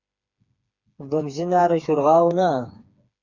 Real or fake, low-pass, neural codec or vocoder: fake; 7.2 kHz; codec, 16 kHz, 4 kbps, FreqCodec, smaller model